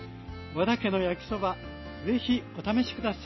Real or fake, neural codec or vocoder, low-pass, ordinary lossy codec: real; none; 7.2 kHz; MP3, 24 kbps